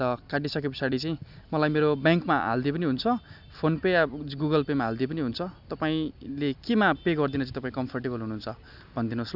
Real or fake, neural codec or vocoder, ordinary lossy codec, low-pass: real; none; none; 5.4 kHz